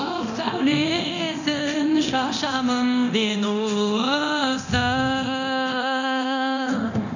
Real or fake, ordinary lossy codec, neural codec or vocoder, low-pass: fake; none; codec, 24 kHz, 0.9 kbps, DualCodec; 7.2 kHz